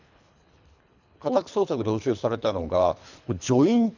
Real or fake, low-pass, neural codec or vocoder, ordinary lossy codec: fake; 7.2 kHz; codec, 24 kHz, 3 kbps, HILCodec; none